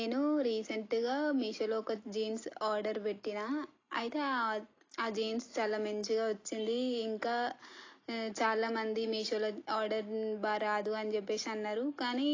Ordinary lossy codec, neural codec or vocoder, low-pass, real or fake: AAC, 32 kbps; none; 7.2 kHz; real